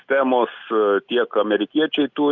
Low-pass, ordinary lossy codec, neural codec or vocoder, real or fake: 7.2 kHz; Opus, 64 kbps; none; real